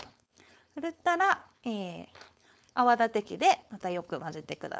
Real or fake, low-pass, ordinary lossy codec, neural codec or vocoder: fake; none; none; codec, 16 kHz, 4.8 kbps, FACodec